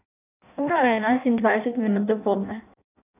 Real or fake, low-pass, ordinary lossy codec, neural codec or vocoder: fake; 3.6 kHz; none; codec, 16 kHz in and 24 kHz out, 0.6 kbps, FireRedTTS-2 codec